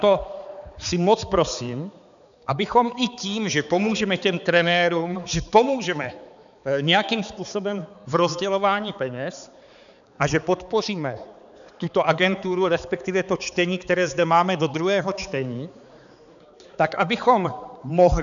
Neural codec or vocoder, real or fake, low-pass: codec, 16 kHz, 4 kbps, X-Codec, HuBERT features, trained on balanced general audio; fake; 7.2 kHz